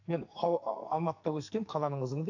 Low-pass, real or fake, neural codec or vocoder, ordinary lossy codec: 7.2 kHz; fake; codec, 44.1 kHz, 2.6 kbps, SNAC; AAC, 48 kbps